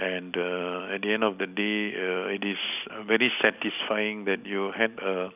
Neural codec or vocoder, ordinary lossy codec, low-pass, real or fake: none; none; 3.6 kHz; real